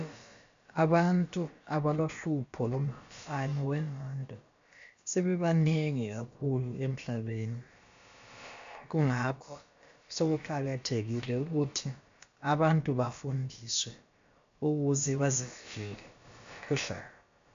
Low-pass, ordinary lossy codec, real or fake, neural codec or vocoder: 7.2 kHz; MP3, 64 kbps; fake; codec, 16 kHz, about 1 kbps, DyCAST, with the encoder's durations